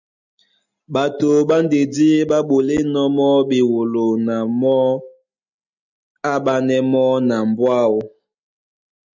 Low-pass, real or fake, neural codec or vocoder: 7.2 kHz; real; none